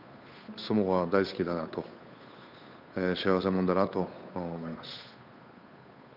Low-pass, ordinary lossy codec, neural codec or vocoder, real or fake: 5.4 kHz; none; codec, 16 kHz, 8 kbps, FunCodec, trained on Chinese and English, 25 frames a second; fake